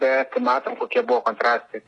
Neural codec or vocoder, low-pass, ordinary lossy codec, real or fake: none; 10.8 kHz; AAC, 32 kbps; real